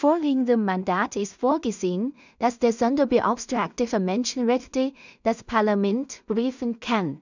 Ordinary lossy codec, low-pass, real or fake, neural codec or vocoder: none; 7.2 kHz; fake; codec, 16 kHz in and 24 kHz out, 0.4 kbps, LongCat-Audio-Codec, two codebook decoder